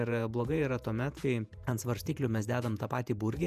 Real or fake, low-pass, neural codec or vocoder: fake; 14.4 kHz; vocoder, 48 kHz, 128 mel bands, Vocos